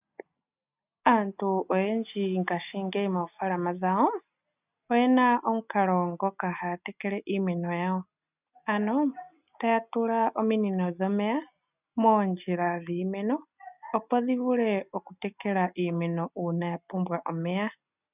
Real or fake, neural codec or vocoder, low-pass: real; none; 3.6 kHz